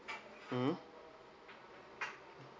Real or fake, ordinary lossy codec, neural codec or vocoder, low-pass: real; none; none; none